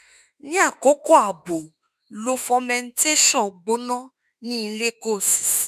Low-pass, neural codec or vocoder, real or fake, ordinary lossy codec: 14.4 kHz; autoencoder, 48 kHz, 32 numbers a frame, DAC-VAE, trained on Japanese speech; fake; none